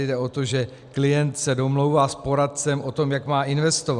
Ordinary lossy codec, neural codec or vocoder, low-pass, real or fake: Opus, 64 kbps; none; 10.8 kHz; real